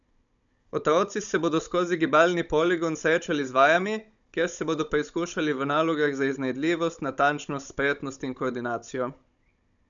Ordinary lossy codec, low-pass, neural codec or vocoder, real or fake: none; 7.2 kHz; codec, 16 kHz, 16 kbps, FunCodec, trained on Chinese and English, 50 frames a second; fake